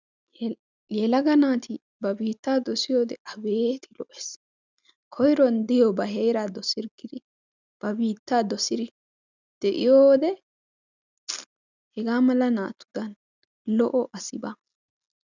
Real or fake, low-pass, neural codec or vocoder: real; 7.2 kHz; none